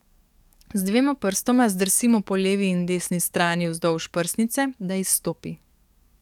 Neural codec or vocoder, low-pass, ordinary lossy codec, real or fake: codec, 44.1 kHz, 7.8 kbps, DAC; 19.8 kHz; none; fake